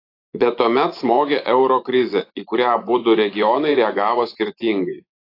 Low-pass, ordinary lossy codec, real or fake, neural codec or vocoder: 5.4 kHz; AAC, 32 kbps; fake; vocoder, 24 kHz, 100 mel bands, Vocos